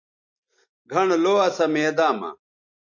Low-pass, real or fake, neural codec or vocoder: 7.2 kHz; real; none